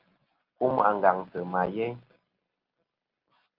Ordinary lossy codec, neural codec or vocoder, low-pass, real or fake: Opus, 32 kbps; none; 5.4 kHz; real